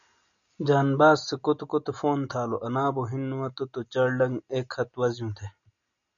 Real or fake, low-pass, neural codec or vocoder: real; 7.2 kHz; none